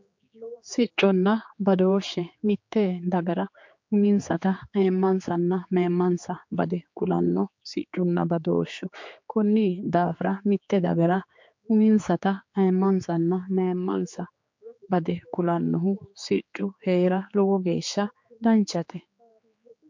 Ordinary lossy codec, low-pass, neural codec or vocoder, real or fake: MP3, 48 kbps; 7.2 kHz; codec, 16 kHz, 4 kbps, X-Codec, HuBERT features, trained on general audio; fake